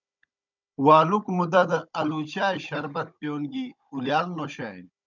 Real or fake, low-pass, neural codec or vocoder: fake; 7.2 kHz; codec, 16 kHz, 16 kbps, FunCodec, trained on Chinese and English, 50 frames a second